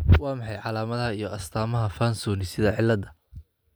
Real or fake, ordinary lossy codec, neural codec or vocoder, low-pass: real; none; none; none